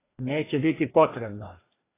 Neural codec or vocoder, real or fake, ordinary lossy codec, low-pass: codec, 16 kHz, 2 kbps, FreqCodec, larger model; fake; AAC, 16 kbps; 3.6 kHz